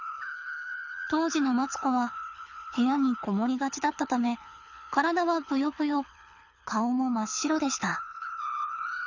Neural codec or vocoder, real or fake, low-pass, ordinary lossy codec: codec, 24 kHz, 6 kbps, HILCodec; fake; 7.2 kHz; none